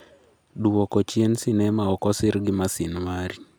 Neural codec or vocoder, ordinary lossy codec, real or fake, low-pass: none; none; real; none